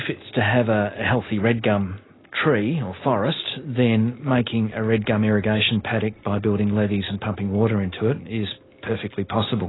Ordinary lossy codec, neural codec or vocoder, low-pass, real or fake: AAC, 16 kbps; none; 7.2 kHz; real